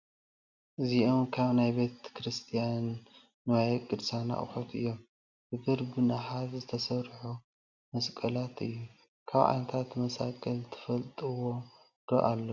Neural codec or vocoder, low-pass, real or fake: none; 7.2 kHz; real